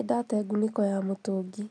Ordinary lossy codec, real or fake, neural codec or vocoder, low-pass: none; real; none; 9.9 kHz